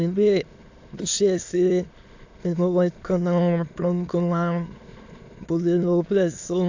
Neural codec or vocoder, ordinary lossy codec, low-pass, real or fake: autoencoder, 22.05 kHz, a latent of 192 numbers a frame, VITS, trained on many speakers; AAC, 48 kbps; 7.2 kHz; fake